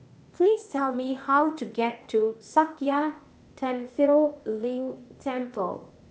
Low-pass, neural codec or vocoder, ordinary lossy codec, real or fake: none; codec, 16 kHz, 0.8 kbps, ZipCodec; none; fake